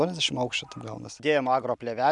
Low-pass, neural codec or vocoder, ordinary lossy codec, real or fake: 10.8 kHz; none; MP3, 96 kbps; real